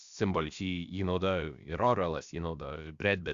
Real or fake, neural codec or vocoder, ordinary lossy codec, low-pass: fake; codec, 16 kHz, about 1 kbps, DyCAST, with the encoder's durations; Opus, 64 kbps; 7.2 kHz